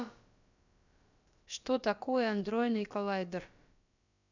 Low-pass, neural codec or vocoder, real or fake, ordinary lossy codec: 7.2 kHz; codec, 16 kHz, about 1 kbps, DyCAST, with the encoder's durations; fake; none